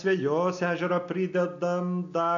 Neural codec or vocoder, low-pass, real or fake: none; 7.2 kHz; real